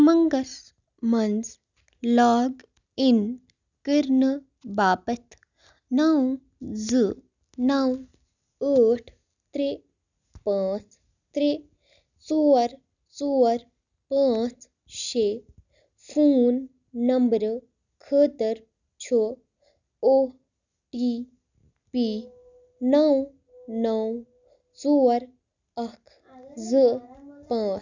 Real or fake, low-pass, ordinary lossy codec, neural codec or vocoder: real; 7.2 kHz; none; none